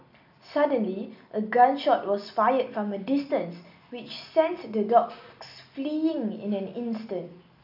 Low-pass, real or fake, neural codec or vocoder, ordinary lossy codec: 5.4 kHz; real; none; AAC, 48 kbps